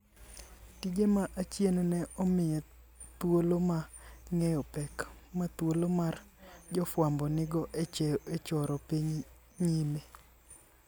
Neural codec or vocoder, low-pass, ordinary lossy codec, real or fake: none; none; none; real